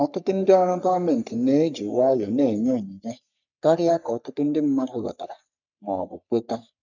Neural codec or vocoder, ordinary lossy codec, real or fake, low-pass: codec, 44.1 kHz, 3.4 kbps, Pupu-Codec; none; fake; 7.2 kHz